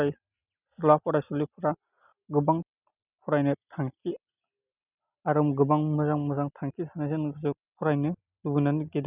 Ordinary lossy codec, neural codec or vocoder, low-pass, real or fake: none; none; 3.6 kHz; real